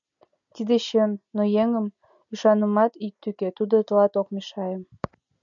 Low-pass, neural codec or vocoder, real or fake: 7.2 kHz; none; real